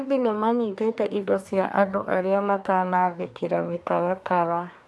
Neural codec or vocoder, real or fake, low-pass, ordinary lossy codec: codec, 24 kHz, 1 kbps, SNAC; fake; none; none